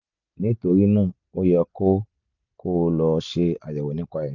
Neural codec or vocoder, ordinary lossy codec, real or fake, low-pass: none; none; real; 7.2 kHz